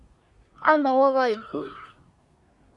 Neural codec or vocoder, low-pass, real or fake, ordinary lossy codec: codec, 24 kHz, 1 kbps, SNAC; 10.8 kHz; fake; Opus, 64 kbps